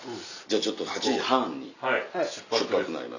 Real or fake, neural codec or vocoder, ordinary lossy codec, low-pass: real; none; none; 7.2 kHz